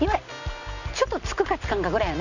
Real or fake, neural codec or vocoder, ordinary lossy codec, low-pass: real; none; none; 7.2 kHz